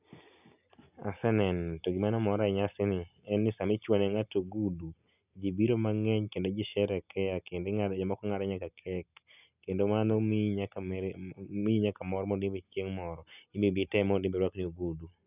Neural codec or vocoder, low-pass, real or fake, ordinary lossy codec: none; 3.6 kHz; real; none